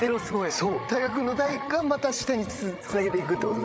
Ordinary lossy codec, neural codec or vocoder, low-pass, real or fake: none; codec, 16 kHz, 16 kbps, FreqCodec, larger model; none; fake